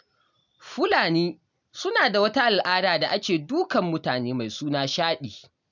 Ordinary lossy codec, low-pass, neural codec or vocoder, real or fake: none; 7.2 kHz; none; real